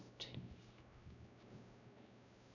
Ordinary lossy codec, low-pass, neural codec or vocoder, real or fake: none; 7.2 kHz; codec, 16 kHz, 0.5 kbps, X-Codec, WavLM features, trained on Multilingual LibriSpeech; fake